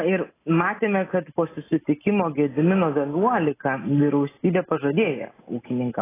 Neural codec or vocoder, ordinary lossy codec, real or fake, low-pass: none; AAC, 16 kbps; real; 3.6 kHz